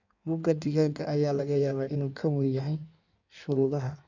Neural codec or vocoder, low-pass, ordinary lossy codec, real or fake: codec, 16 kHz in and 24 kHz out, 1.1 kbps, FireRedTTS-2 codec; 7.2 kHz; none; fake